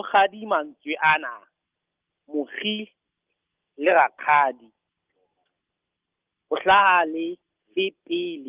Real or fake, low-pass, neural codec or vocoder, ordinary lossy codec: real; 3.6 kHz; none; Opus, 32 kbps